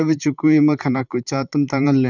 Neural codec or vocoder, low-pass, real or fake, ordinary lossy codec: vocoder, 44.1 kHz, 128 mel bands, Pupu-Vocoder; 7.2 kHz; fake; none